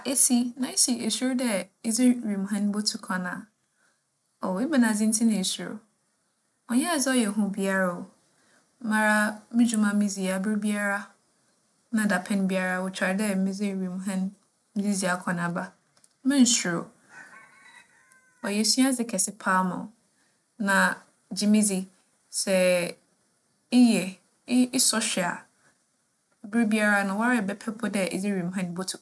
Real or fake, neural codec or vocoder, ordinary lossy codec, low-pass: real; none; none; none